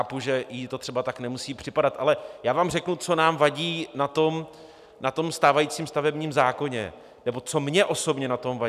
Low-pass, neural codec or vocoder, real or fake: 14.4 kHz; none; real